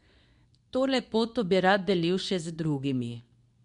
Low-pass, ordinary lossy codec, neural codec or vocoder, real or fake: 10.8 kHz; none; codec, 24 kHz, 0.9 kbps, WavTokenizer, medium speech release version 2; fake